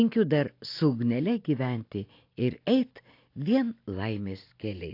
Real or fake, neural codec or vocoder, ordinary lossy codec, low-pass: real; none; AAC, 32 kbps; 5.4 kHz